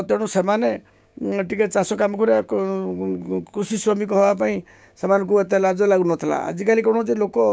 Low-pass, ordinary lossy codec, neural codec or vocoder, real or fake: none; none; codec, 16 kHz, 6 kbps, DAC; fake